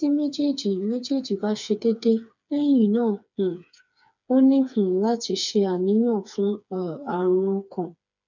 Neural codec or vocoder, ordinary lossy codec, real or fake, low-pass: codec, 16 kHz, 4 kbps, FreqCodec, smaller model; none; fake; 7.2 kHz